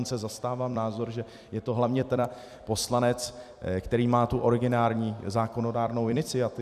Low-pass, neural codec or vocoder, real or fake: 14.4 kHz; vocoder, 44.1 kHz, 128 mel bands every 256 samples, BigVGAN v2; fake